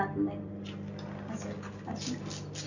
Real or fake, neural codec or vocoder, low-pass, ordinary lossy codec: real; none; 7.2 kHz; none